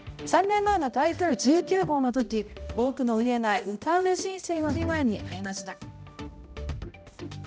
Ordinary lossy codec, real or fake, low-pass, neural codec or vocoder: none; fake; none; codec, 16 kHz, 0.5 kbps, X-Codec, HuBERT features, trained on balanced general audio